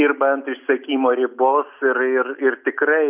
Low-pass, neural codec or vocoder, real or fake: 3.6 kHz; none; real